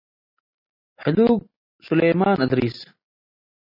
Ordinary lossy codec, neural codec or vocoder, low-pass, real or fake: MP3, 24 kbps; none; 5.4 kHz; real